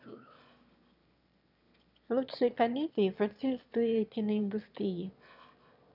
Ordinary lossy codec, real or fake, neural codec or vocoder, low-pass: none; fake; autoencoder, 22.05 kHz, a latent of 192 numbers a frame, VITS, trained on one speaker; 5.4 kHz